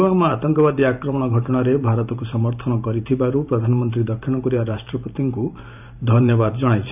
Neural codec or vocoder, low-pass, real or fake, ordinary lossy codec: vocoder, 44.1 kHz, 128 mel bands every 512 samples, BigVGAN v2; 3.6 kHz; fake; none